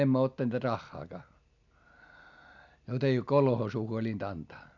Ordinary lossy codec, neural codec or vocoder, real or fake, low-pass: none; none; real; 7.2 kHz